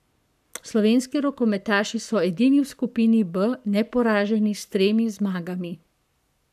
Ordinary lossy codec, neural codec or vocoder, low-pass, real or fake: none; codec, 44.1 kHz, 7.8 kbps, Pupu-Codec; 14.4 kHz; fake